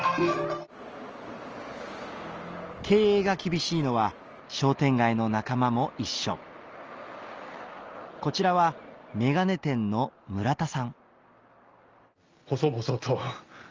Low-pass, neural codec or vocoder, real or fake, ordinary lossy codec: 7.2 kHz; none; real; Opus, 24 kbps